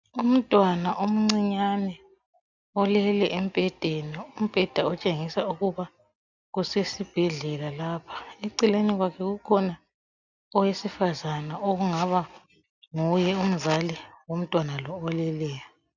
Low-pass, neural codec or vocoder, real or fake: 7.2 kHz; none; real